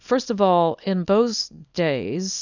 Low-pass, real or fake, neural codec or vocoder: 7.2 kHz; fake; codec, 24 kHz, 0.9 kbps, WavTokenizer, small release